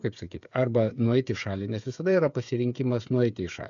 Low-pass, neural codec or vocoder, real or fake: 7.2 kHz; codec, 16 kHz, 8 kbps, FreqCodec, smaller model; fake